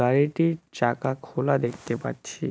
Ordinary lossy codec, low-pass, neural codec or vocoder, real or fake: none; none; none; real